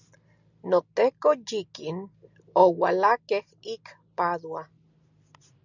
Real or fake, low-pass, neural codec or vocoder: real; 7.2 kHz; none